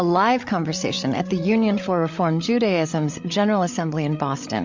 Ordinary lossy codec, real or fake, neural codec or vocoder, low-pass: MP3, 48 kbps; fake; codec, 16 kHz, 16 kbps, FreqCodec, larger model; 7.2 kHz